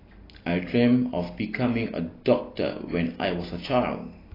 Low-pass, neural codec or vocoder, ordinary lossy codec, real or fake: 5.4 kHz; none; AAC, 24 kbps; real